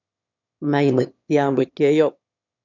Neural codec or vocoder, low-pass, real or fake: autoencoder, 22.05 kHz, a latent of 192 numbers a frame, VITS, trained on one speaker; 7.2 kHz; fake